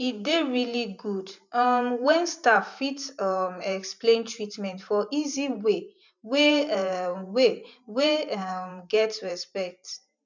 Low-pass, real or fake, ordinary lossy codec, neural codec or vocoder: 7.2 kHz; fake; none; vocoder, 44.1 kHz, 128 mel bands every 512 samples, BigVGAN v2